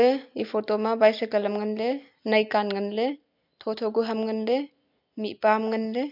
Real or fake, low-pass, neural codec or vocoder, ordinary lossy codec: real; 5.4 kHz; none; MP3, 48 kbps